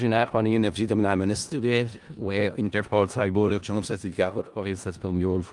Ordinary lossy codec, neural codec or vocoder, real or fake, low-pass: Opus, 32 kbps; codec, 16 kHz in and 24 kHz out, 0.4 kbps, LongCat-Audio-Codec, four codebook decoder; fake; 10.8 kHz